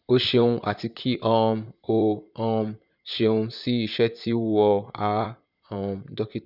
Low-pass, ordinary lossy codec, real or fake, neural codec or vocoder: 5.4 kHz; none; fake; vocoder, 44.1 kHz, 128 mel bands, Pupu-Vocoder